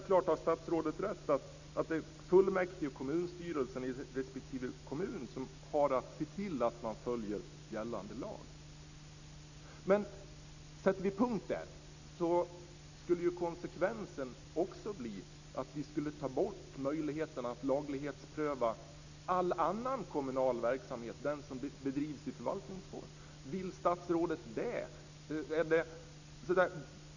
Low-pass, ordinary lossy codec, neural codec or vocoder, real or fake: 7.2 kHz; none; none; real